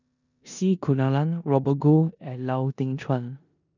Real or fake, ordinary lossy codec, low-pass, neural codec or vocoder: fake; none; 7.2 kHz; codec, 16 kHz in and 24 kHz out, 0.9 kbps, LongCat-Audio-Codec, four codebook decoder